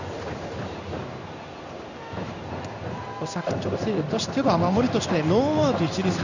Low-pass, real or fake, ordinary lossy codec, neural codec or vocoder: 7.2 kHz; fake; none; codec, 16 kHz in and 24 kHz out, 1 kbps, XY-Tokenizer